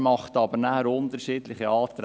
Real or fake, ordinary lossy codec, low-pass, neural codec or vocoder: real; none; none; none